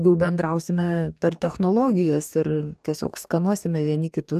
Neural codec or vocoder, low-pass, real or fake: codec, 44.1 kHz, 2.6 kbps, DAC; 14.4 kHz; fake